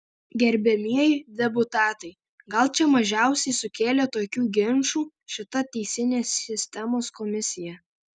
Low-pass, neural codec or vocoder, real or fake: 9.9 kHz; none; real